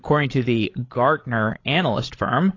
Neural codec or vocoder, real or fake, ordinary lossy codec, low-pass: codec, 16 kHz, 16 kbps, FreqCodec, larger model; fake; AAC, 32 kbps; 7.2 kHz